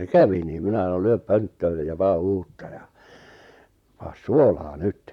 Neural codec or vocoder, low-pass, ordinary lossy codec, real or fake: vocoder, 44.1 kHz, 128 mel bands, Pupu-Vocoder; 19.8 kHz; none; fake